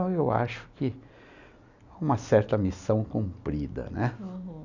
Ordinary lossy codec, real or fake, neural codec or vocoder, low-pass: none; real; none; 7.2 kHz